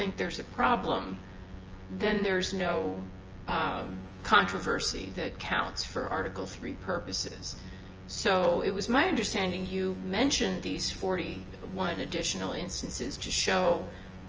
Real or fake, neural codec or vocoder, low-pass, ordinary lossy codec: fake; vocoder, 24 kHz, 100 mel bands, Vocos; 7.2 kHz; Opus, 24 kbps